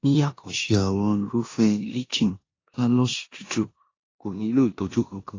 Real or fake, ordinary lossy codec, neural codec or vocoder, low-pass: fake; AAC, 32 kbps; codec, 16 kHz in and 24 kHz out, 0.9 kbps, LongCat-Audio-Codec, four codebook decoder; 7.2 kHz